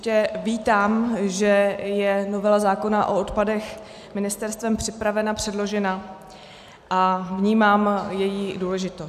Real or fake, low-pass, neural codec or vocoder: real; 14.4 kHz; none